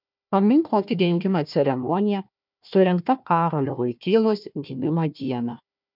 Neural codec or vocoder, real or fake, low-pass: codec, 16 kHz, 1 kbps, FunCodec, trained on Chinese and English, 50 frames a second; fake; 5.4 kHz